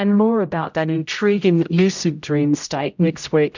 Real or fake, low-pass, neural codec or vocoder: fake; 7.2 kHz; codec, 16 kHz, 0.5 kbps, X-Codec, HuBERT features, trained on general audio